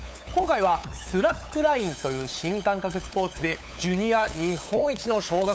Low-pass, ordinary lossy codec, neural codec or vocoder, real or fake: none; none; codec, 16 kHz, 8 kbps, FunCodec, trained on LibriTTS, 25 frames a second; fake